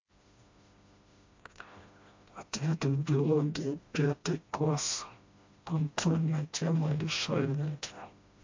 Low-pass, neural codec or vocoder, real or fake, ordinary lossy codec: 7.2 kHz; codec, 16 kHz, 1 kbps, FreqCodec, smaller model; fake; MP3, 48 kbps